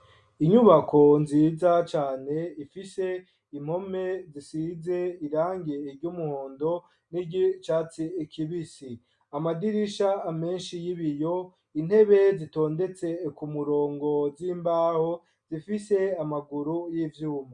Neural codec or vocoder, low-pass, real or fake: none; 9.9 kHz; real